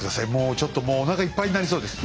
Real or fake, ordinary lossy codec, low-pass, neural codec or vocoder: real; none; none; none